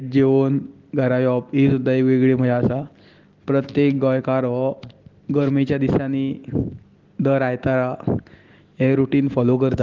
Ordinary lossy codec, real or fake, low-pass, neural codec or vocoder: Opus, 16 kbps; real; 7.2 kHz; none